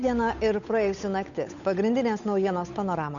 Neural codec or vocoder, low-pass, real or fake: codec, 16 kHz, 8 kbps, FunCodec, trained on Chinese and English, 25 frames a second; 7.2 kHz; fake